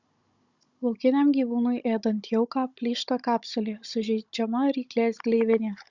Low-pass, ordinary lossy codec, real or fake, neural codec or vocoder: 7.2 kHz; Opus, 64 kbps; fake; codec, 16 kHz, 16 kbps, FunCodec, trained on Chinese and English, 50 frames a second